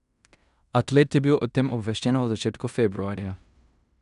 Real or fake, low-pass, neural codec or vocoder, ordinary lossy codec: fake; 10.8 kHz; codec, 16 kHz in and 24 kHz out, 0.9 kbps, LongCat-Audio-Codec, fine tuned four codebook decoder; none